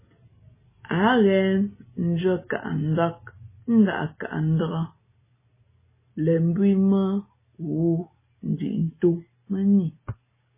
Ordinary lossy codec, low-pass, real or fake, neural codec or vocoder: MP3, 16 kbps; 3.6 kHz; real; none